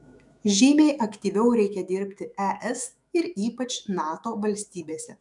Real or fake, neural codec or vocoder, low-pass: fake; autoencoder, 48 kHz, 128 numbers a frame, DAC-VAE, trained on Japanese speech; 10.8 kHz